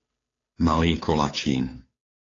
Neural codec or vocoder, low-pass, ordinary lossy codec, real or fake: codec, 16 kHz, 2 kbps, FunCodec, trained on Chinese and English, 25 frames a second; 7.2 kHz; AAC, 32 kbps; fake